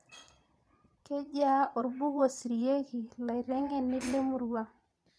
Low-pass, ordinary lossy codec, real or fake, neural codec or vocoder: none; none; fake; vocoder, 22.05 kHz, 80 mel bands, Vocos